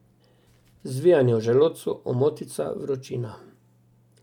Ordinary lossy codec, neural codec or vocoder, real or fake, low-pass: MP3, 96 kbps; none; real; 19.8 kHz